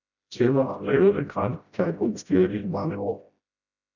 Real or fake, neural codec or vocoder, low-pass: fake; codec, 16 kHz, 0.5 kbps, FreqCodec, smaller model; 7.2 kHz